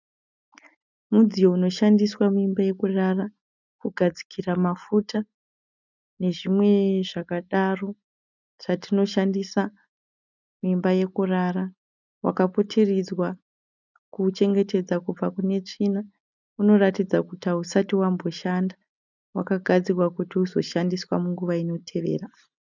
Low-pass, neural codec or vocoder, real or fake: 7.2 kHz; none; real